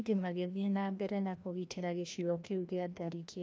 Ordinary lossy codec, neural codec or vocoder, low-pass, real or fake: none; codec, 16 kHz, 1 kbps, FreqCodec, larger model; none; fake